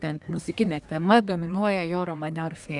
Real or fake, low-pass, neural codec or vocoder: fake; 10.8 kHz; codec, 24 kHz, 1 kbps, SNAC